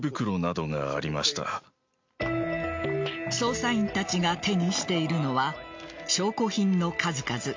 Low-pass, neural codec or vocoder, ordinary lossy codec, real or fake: 7.2 kHz; none; MP3, 48 kbps; real